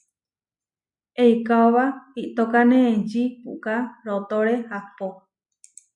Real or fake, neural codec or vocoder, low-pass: real; none; 10.8 kHz